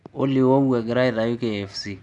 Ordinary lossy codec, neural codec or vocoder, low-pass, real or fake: none; none; 10.8 kHz; real